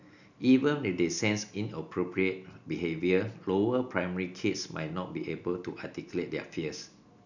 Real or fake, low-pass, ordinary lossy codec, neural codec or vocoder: real; 7.2 kHz; none; none